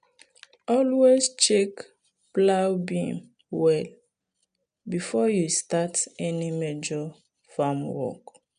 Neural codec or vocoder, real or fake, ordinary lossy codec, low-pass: none; real; none; 9.9 kHz